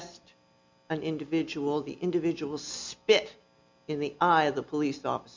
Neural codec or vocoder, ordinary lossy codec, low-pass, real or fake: none; MP3, 64 kbps; 7.2 kHz; real